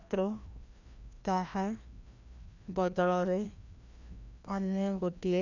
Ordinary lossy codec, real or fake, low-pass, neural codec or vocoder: none; fake; 7.2 kHz; codec, 16 kHz, 1 kbps, FreqCodec, larger model